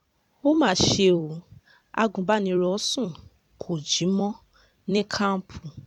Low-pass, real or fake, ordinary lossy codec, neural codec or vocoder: 19.8 kHz; fake; none; vocoder, 44.1 kHz, 128 mel bands every 256 samples, BigVGAN v2